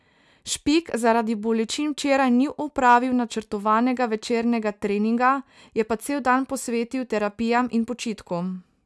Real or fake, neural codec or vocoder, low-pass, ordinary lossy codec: real; none; none; none